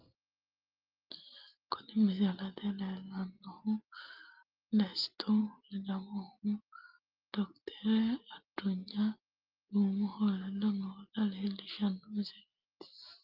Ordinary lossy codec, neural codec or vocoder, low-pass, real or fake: Opus, 32 kbps; none; 5.4 kHz; real